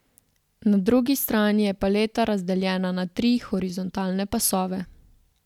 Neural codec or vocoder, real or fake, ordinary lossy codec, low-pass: none; real; none; 19.8 kHz